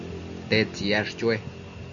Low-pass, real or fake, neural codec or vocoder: 7.2 kHz; real; none